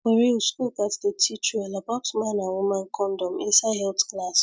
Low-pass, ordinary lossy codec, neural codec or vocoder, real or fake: none; none; none; real